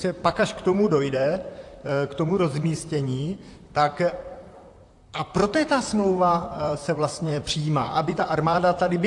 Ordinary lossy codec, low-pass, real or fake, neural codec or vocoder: AAC, 64 kbps; 10.8 kHz; fake; vocoder, 44.1 kHz, 128 mel bands, Pupu-Vocoder